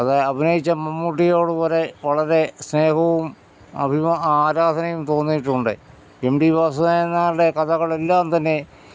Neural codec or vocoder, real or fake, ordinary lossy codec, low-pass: none; real; none; none